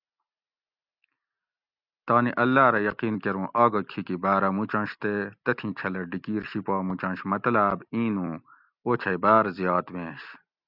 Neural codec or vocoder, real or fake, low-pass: none; real; 5.4 kHz